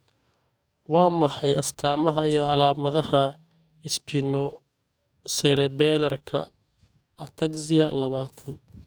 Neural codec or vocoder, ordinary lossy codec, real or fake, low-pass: codec, 44.1 kHz, 2.6 kbps, DAC; none; fake; none